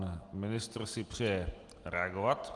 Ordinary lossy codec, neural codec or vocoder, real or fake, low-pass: Opus, 24 kbps; none; real; 10.8 kHz